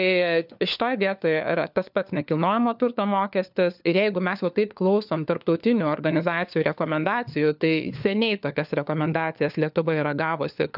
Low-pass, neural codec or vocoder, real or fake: 5.4 kHz; codec, 16 kHz, 4 kbps, FunCodec, trained on LibriTTS, 50 frames a second; fake